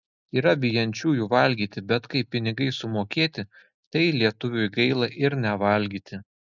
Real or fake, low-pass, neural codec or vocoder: real; 7.2 kHz; none